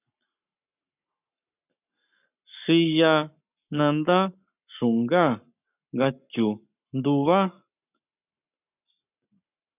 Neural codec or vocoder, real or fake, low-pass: codec, 44.1 kHz, 7.8 kbps, Pupu-Codec; fake; 3.6 kHz